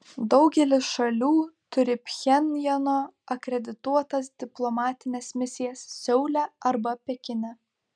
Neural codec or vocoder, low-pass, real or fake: none; 9.9 kHz; real